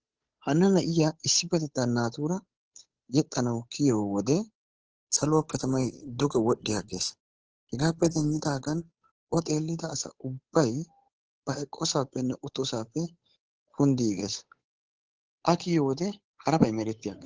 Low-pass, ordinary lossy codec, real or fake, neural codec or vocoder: 7.2 kHz; Opus, 16 kbps; fake; codec, 16 kHz, 8 kbps, FunCodec, trained on Chinese and English, 25 frames a second